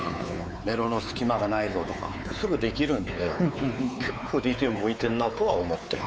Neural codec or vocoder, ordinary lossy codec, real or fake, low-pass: codec, 16 kHz, 4 kbps, X-Codec, WavLM features, trained on Multilingual LibriSpeech; none; fake; none